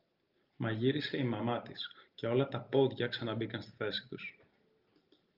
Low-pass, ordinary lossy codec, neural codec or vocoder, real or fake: 5.4 kHz; Opus, 24 kbps; none; real